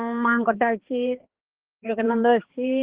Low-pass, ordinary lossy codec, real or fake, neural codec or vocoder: 3.6 kHz; Opus, 16 kbps; fake; codec, 16 kHz, 2 kbps, X-Codec, HuBERT features, trained on balanced general audio